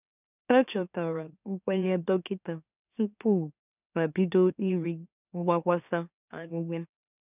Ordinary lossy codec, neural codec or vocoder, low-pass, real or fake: none; autoencoder, 44.1 kHz, a latent of 192 numbers a frame, MeloTTS; 3.6 kHz; fake